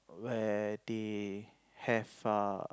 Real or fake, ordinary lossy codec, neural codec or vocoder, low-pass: real; none; none; none